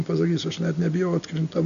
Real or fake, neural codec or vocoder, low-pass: real; none; 7.2 kHz